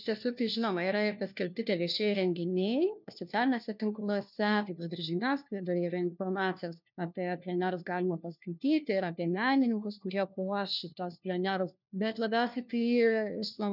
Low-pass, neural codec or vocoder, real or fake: 5.4 kHz; codec, 16 kHz, 1 kbps, FunCodec, trained on LibriTTS, 50 frames a second; fake